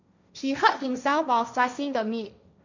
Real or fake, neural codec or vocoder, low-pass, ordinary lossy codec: fake; codec, 16 kHz, 1.1 kbps, Voila-Tokenizer; 7.2 kHz; none